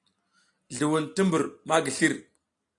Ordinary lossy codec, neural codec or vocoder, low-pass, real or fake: AAC, 48 kbps; none; 10.8 kHz; real